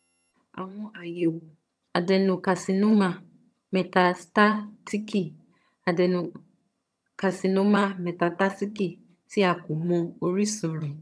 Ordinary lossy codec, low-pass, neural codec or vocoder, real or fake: none; none; vocoder, 22.05 kHz, 80 mel bands, HiFi-GAN; fake